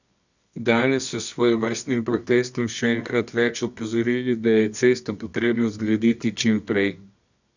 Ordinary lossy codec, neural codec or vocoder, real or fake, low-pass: none; codec, 24 kHz, 0.9 kbps, WavTokenizer, medium music audio release; fake; 7.2 kHz